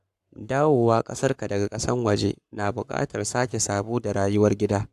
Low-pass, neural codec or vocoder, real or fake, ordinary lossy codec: 14.4 kHz; codec, 44.1 kHz, 7.8 kbps, Pupu-Codec; fake; AAC, 96 kbps